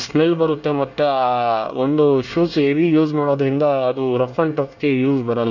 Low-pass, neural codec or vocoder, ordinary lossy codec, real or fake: 7.2 kHz; codec, 24 kHz, 1 kbps, SNAC; none; fake